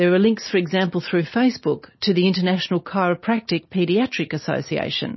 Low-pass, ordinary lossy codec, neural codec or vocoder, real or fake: 7.2 kHz; MP3, 24 kbps; none; real